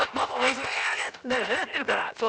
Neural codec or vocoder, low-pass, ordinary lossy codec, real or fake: codec, 16 kHz, 0.7 kbps, FocalCodec; none; none; fake